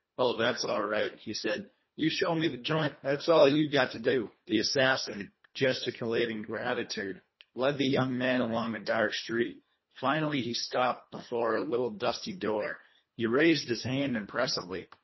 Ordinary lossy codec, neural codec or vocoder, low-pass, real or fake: MP3, 24 kbps; codec, 24 kHz, 1.5 kbps, HILCodec; 7.2 kHz; fake